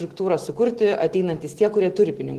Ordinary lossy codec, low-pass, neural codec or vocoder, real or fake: Opus, 16 kbps; 14.4 kHz; autoencoder, 48 kHz, 128 numbers a frame, DAC-VAE, trained on Japanese speech; fake